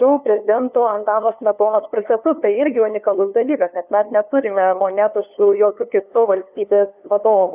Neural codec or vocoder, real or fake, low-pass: codec, 16 kHz, 2 kbps, FunCodec, trained on LibriTTS, 25 frames a second; fake; 3.6 kHz